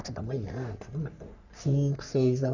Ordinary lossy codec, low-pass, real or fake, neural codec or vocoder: none; 7.2 kHz; fake; codec, 44.1 kHz, 3.4 kbps, Pupu-Codec